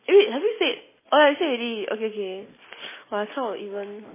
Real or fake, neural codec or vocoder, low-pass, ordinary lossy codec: real; none; 3.6 kHz; MP3, 16 kbps